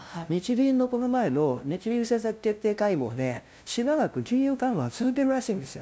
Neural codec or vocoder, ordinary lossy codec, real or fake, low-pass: codec, 16 kHz, 0.5 kbps, FunCodec, trained on LibriTTS, 25 frames a second; none; fake; none